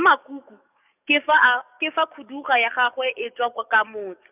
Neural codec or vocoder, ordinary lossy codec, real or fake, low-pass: codec, 44.1 kHz, 7.8 kbps, DAC; none; fake; 3.6 kHz